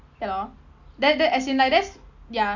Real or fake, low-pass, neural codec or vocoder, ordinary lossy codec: real; 7.2 kHz; none; none